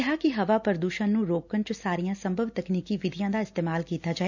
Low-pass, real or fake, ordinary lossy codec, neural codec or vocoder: 7.2 kHz; real; none; none